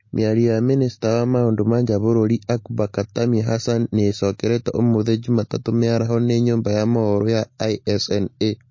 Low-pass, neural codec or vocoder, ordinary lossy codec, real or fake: 7.2 kHz; none; MP3, 32 kbps; real